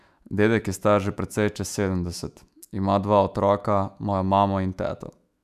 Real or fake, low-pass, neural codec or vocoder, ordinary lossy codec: fake; 14.4 kHz; autoencoder, 48 kHz, 128 numbers a frame, DAC-VAE, trained on Japanese speech; none